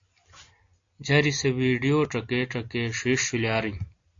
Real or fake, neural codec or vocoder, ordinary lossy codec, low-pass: real; none; AAC, 48 kbps; 7.2 kHz